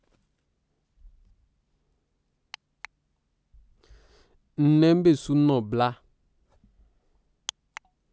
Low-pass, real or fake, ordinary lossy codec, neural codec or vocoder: none; real; none; none